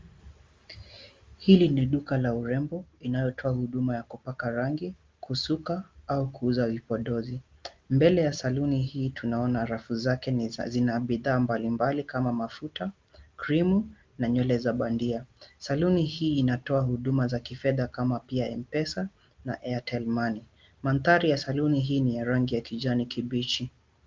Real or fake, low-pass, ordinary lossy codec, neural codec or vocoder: real; 7.2 kHz; Opus, 32 kbps; none